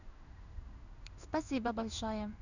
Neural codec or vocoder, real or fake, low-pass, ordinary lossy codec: codec, 16 kHz in and 24 kHz out, 1 kbps, XY-Tokenizer; fake; 7.2 kHz; none